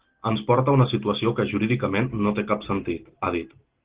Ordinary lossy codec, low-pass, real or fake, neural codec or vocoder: Opus, 16 kbps; 3.6 kHz; real; none